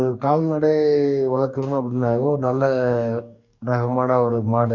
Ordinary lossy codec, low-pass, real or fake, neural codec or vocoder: none; 7.2 kHz; fake; codec, 44.1 kHz, 2.6 kbps, DAC